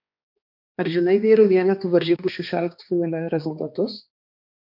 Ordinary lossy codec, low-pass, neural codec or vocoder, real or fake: MP3, 48 kbps; 5.4 kHz; codec, 16 kHz, 2 kbps, X-Codec, HuBERT features, trained on balanced general audio; fake